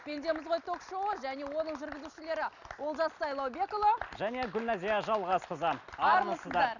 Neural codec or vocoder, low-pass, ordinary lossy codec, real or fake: none; 7.2 kHz; none; real